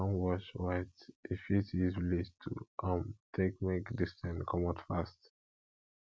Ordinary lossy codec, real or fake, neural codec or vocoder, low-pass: none; real; none; none